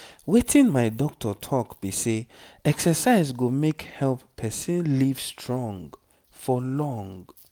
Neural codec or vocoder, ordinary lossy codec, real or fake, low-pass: none; none; real; none